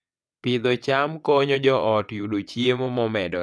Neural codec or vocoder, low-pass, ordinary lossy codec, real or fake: vocoder, 22.05 kHz, 80 mel bands, WaveNeXt; none; none; fake